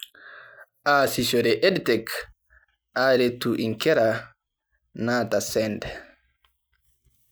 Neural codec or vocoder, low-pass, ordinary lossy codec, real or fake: none; none; none; real